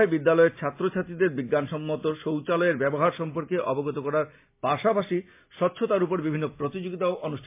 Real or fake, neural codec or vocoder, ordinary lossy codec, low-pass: real; none; MP3, 24 kbps; 3.6 kHz